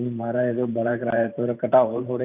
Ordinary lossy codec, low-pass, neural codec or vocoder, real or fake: none; 3.6 kHz; vocoder, 44.1 kHz, 128 mel bands every 512 samples, BigVGAN v2; fake